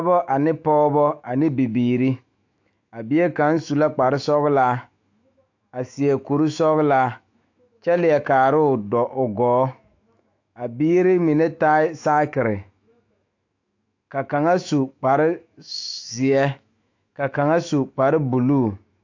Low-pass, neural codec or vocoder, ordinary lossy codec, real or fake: 7.2 kHz; autoencoder, 48 kHz, 128 numbers a frame, DAC-VAE, trained on Japanese speech; AAC, 48 kbps; fake